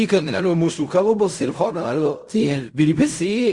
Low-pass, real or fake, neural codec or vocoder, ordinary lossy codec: 10.8 kHz; fake; codec, 16 kHz in and 24 kHz out, 0.4 kbps, LongCat-Audio-Codec, fine tuned four codebook decoder; Opus, 64 kbps